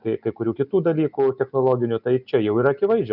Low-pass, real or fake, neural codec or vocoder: 5.4 kHz; real; none